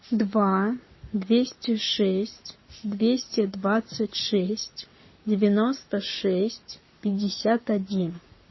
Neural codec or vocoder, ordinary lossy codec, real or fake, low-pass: codec, 44.1 kHz, 7.8 kbps, Pupu-Codec; MP3, 24 kbps; fake; 7.2 kHz